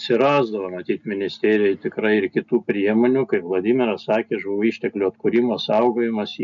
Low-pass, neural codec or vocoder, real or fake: 7.2 kHz; none; real